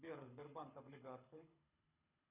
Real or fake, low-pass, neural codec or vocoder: fake; 3.6 kHz; codec, 24 kHz, 6 kbps, HILCodec